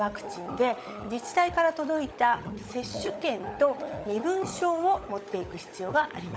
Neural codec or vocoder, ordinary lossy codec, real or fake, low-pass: codec, 16 kHz, 16 kbps, FunCodec, trained on LibriTTS, 50 frames a second; none; fake; none